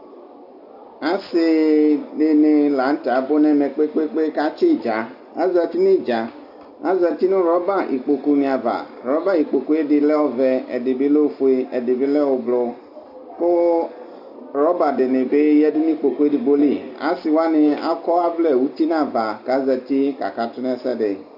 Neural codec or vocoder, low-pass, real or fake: none; 5.4 kHz; real